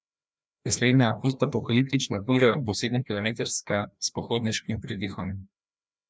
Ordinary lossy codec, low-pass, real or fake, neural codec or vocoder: none; none; fake; codec, 16 kHz, 1 kbps, FreqCodec, larger model